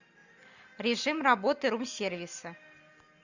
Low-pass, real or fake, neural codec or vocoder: 7.2 kHz; real; none